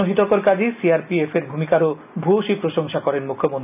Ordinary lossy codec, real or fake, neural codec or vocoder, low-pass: none; real; none; 3.6 kHz